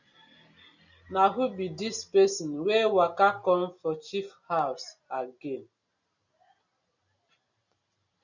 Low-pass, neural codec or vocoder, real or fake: 7.2 kHz; none; real